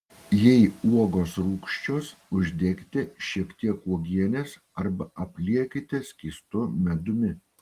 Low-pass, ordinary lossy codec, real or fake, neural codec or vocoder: 14.4 kHz; Opus, 24 kbps; real; none